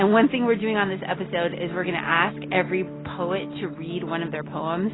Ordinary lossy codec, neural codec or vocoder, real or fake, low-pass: AAC, 16 kbps; none; real; 7.2 kHz